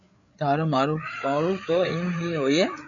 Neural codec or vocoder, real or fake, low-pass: codec, 16 kHz, 8 kbps, FreqCodec, larger model; fake; 7.2 kHz